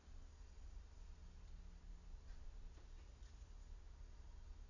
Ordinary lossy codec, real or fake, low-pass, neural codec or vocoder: none; real; 7.2 kHz; none